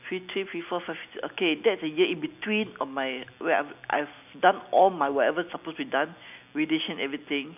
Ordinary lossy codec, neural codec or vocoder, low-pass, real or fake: none; none; 3.6 kHz; real